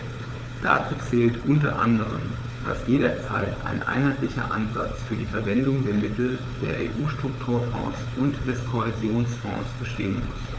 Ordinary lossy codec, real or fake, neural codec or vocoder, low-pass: none; fake; codec, 16 kHz, 4 kbps, FunCodec, trained on Chinese and English, 50 frames a second; none